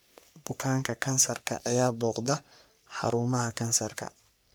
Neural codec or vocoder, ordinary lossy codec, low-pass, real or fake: codec, 44.1 kHz, 3.4 kbps, Pupu-Codec; none; none; fake